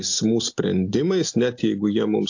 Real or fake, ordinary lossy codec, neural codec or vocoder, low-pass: real; AAC, 48 kbps; none; 7.2 kHz